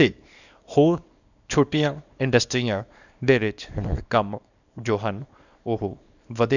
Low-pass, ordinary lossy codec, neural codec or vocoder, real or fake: 7.2 kHz; none; codec, 24 kHz, 0.9 kbps, WavTokenizer, small release; fake